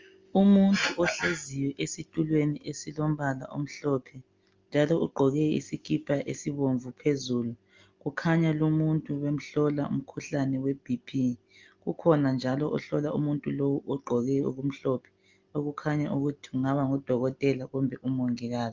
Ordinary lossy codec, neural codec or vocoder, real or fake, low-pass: Opus, 32 kbps; none; real; 7.2 kHz